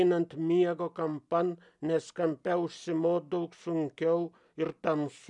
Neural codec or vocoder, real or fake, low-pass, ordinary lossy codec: none; real; 10.8 kHz; MP3, 96 kbps